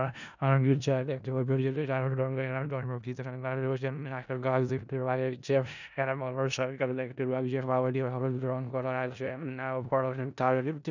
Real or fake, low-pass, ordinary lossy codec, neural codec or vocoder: fake; 7.2 kHz; none; codec, 16 kHz in and 24 kHz out, 0.4 kbps, LongCat-Audio-Codec, four codebook decoder